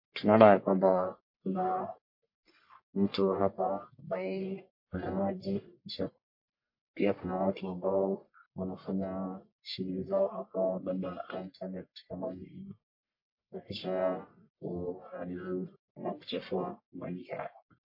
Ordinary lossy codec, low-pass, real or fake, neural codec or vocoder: MP3, 32 kbps; 5.4 kHz; fake; codec, 44.1 kHz, 1.7 kbps, Pupu-Codec